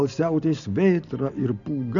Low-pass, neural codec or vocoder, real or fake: 7.2 kHz; none; real